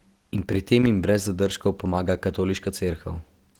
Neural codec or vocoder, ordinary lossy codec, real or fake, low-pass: none; Opus, 16 kbps; real; 19.8 kHz